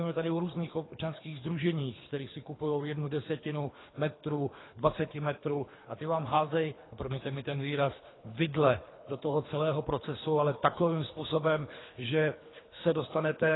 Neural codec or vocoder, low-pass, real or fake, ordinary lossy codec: codec, 24 kHz, 3 kbps, HILCodec; 7.2 kHz; fake; AAC, 16 kbps